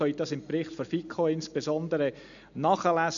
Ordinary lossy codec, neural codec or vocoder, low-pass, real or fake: MP3, 96 kbps; none; 7.2 kHz; real